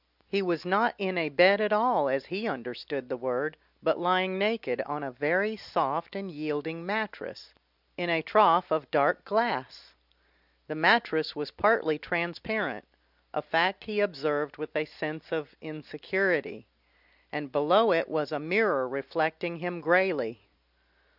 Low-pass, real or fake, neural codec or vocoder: 5.4 kHz; real; none